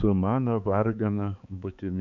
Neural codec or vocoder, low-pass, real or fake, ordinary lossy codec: codec, 16 kHz, 4 kbps, X-Codec, HuBERT features, trained on balanced general audio; 7.2 kHz; fake; AAC, 64 kbps